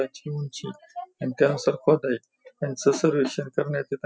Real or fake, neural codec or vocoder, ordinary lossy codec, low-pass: real; none; none; none